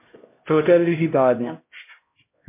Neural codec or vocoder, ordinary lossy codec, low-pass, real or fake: codec, 16 kHz, 0.5 kbps, X-Codec, HuBERT features, trained on LibriSpeech; MP3, 24 kbps; 3.6 kHz; fake